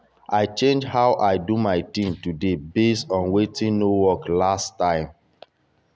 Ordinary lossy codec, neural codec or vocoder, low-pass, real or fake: none; none; none; real